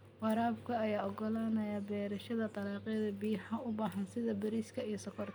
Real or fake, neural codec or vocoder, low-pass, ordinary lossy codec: real; none; none; none